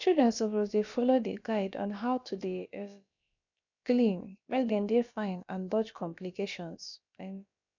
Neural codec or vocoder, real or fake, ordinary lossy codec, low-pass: codec, 16 kHz, about 1 kbps, DyCAST, with the encoder's durations; fake; none; 7.2 kHz